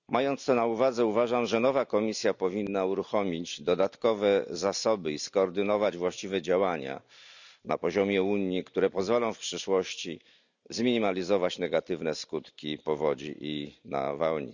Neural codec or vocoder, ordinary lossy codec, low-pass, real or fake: none; none; 7.2 kHz; real